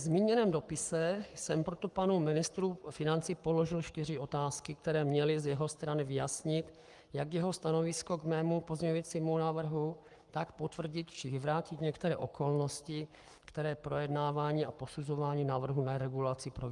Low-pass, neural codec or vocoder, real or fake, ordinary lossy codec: 10.8 kHz; codec, 44.1 kHz, 7.8 kbps, Pupu-Codec; fake; Opus, 32 kbps